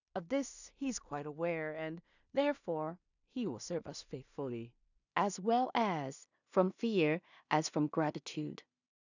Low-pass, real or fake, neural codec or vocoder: 7.2 kHz; fake; codec, 16 kHz in and 24 kHz out, 0.4 kbps, LongCat-Audio-Codec, two codebook decoder